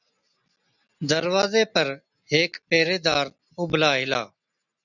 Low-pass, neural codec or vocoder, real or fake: 7.2 kHz; none; real